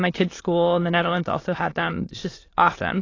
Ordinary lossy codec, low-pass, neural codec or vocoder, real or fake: AAC, 32 kbps; 7.2 kHz; autoencoder, 22.05 kHz, a latent of 192 numbers a frame, VITS, trained on many speakers; fake